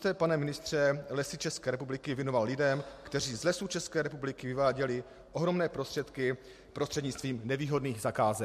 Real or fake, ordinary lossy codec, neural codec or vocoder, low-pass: real; MP3, 64 kbps; none; 14.4 kHz